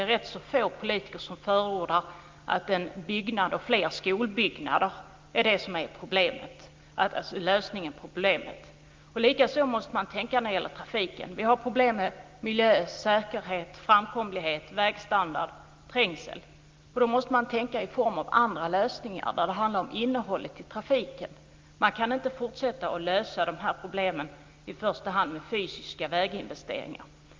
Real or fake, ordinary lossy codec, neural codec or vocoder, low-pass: real; Opus, 24 kbps; none; 7.2 kHz